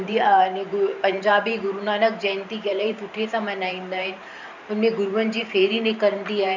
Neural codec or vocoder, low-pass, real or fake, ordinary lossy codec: vocoder, 44.1 kHz, 128 mel bands every 512 samples, BigVGAN v2; 7.2 kHz; fake; none